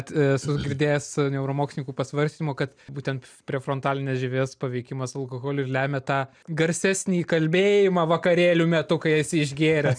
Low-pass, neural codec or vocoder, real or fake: 9.9 kHz; none; real